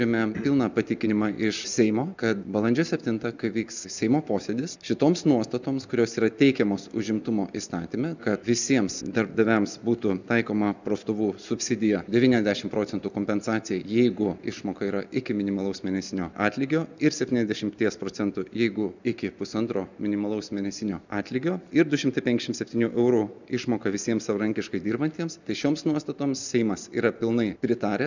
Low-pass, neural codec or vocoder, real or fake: 7.2 kHz; none; real